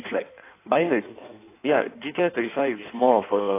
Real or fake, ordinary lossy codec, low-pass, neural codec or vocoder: fake; AAC, 24 kbps; 3.6 kHz; codec, 16 kHz in and 24 kHz out, 1.1 kbps, FireRedTTS-2 codec